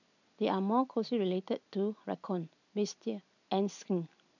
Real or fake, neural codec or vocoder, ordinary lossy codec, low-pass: real; none; none; 7.2 kHz